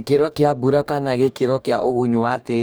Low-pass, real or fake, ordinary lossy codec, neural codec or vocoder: none; fake; none; codec, 44.1 kHz, 2.6 kbps, DAC